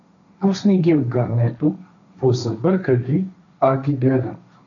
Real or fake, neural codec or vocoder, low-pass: fake; codec, 16 kHz, 1.1 kbps, Voila-Tokenizer; 7.2 kHz